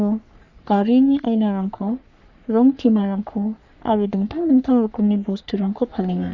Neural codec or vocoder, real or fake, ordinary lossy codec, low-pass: codec, 44.1 kHz, 3.4 kbps, Pupu-Codec; fake; none; 7.2 kHz